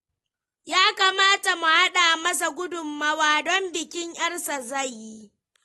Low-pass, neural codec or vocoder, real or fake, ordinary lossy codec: 19.8 kHz; none; real; AAC, 32 kbps